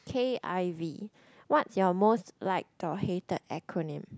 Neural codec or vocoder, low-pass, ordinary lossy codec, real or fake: none; none; none; real